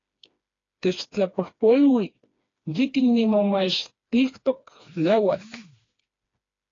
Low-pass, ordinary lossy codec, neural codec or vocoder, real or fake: 7.2 kHz; AAC, 32 kbps; codec, 16 kHz, 2 kbps, FreqCodec, smaller model; fake